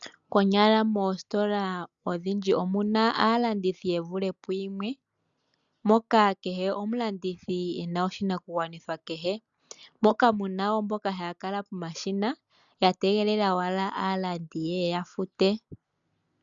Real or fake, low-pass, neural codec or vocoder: real; 7.2 kHz; none